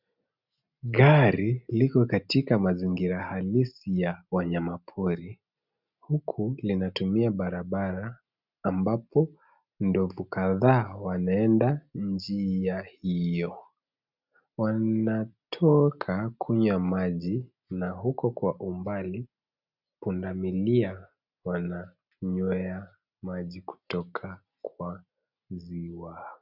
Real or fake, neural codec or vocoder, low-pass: real; none; 5.4 kHz